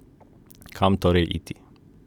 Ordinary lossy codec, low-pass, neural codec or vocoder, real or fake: none; 19.8 kHz; none; real